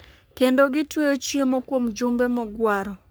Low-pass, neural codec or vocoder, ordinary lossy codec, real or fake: none; codec, 44.1 kHz, 3.4 kbps, Pupu-Codec; none; fake